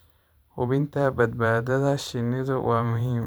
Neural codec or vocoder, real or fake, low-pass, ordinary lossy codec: vocoder, 44.1 kHz, 128 mel bands every 512 samples, BigVGAN v2; fake; none; none